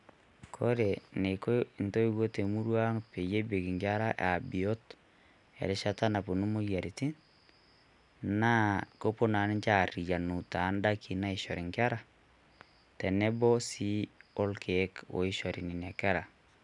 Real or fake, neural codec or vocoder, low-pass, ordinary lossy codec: real; none; 10.8 kHz; none